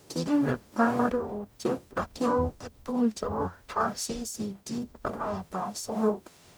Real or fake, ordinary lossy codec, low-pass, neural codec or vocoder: fake; none; none; codec, 44.1 kHz, 0.9 kbps, DAC